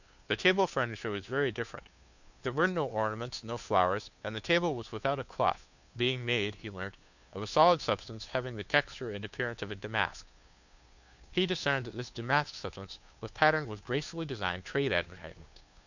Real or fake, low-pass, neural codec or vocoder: fake; 7.2 kHz; codec, 16 kHz, 2 kbps, FunCodec, trained on Chinese and English, 25 frames a second